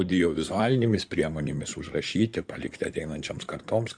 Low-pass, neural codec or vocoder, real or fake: 9.9 kHz; codec, 16 kHz in and 24 kHz out, 2.2 kbps, FireRedTTS-2 codec; fake